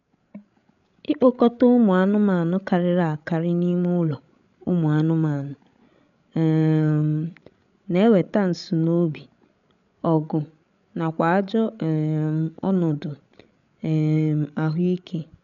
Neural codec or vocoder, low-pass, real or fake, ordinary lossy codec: codec, 16 kHz, 16 kbps, FreqCodec, larger model; 7.2 kHz; fake; none